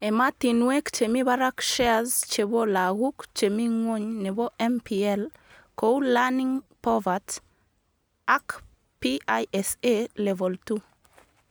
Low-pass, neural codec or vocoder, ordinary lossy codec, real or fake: none; none; none; real